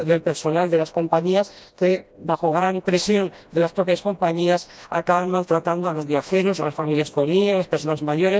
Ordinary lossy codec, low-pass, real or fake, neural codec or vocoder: none; none; fake; codec, 16 kHz, 1 kbps, FreqCodec, smaller model